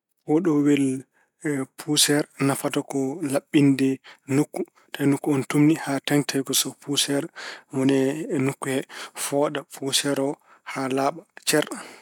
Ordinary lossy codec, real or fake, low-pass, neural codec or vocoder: none; real; 19.8 kHz; none